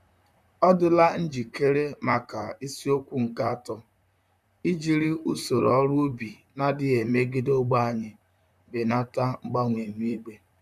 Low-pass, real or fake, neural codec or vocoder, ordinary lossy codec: 14.4 kHz; fake; vocoder, 44.1 kHz, 128 mel bands, Pupu-Vocoder; none